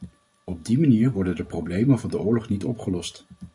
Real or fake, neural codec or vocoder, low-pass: fake; vocoder, 44.1 kHz, 128 mel bands every 512 samples, BigVGAN v2; 10.8 kHz